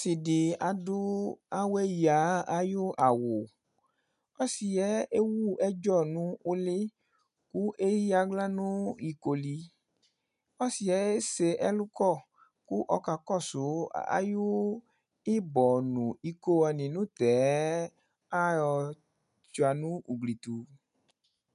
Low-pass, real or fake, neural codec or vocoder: 10.8 kHz; real; none